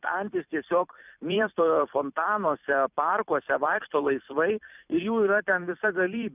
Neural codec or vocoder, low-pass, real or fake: vocoder, 44.1 kHz, 128 mel bands every 256 samples, BigVGAN v2; 3.6 kHz; fake